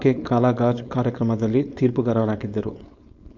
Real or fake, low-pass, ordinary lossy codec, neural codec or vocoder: fake; 7.2 kHz; none; codec, 16 kHz, 4.8 kbps, FACodec